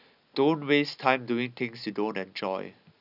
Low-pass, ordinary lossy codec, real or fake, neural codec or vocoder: 5.4 kHz; none; real; none